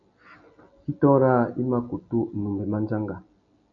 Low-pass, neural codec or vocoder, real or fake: 7.2 kHz; none; real